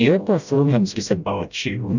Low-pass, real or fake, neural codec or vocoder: 7.2 kHz; fake; codec, 16 kHz, 0.5 kbps, FreqCodec, smaller model